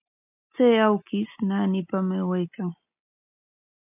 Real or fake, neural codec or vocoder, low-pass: real; none; 3.6 kHz